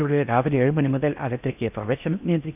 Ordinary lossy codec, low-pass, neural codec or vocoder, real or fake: none; 3.6 kHz; codec, 16 kHz in and 24 kHz out, 0.6 kbps, FocalCodec, streaming, 4096 codes; fake